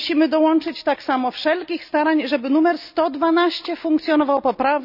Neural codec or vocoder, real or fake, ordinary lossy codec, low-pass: none; real; none; 5.4 kHz